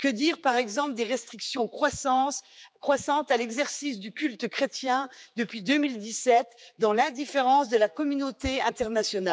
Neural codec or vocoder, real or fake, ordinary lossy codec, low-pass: codec, 16 kHz, 4 kbps, X-Codec, HuBERT features, trained on general audio; fake; none; none